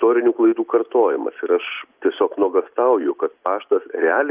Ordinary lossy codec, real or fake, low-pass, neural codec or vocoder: Opus, 24 kbps; real; 3.6 kHz; none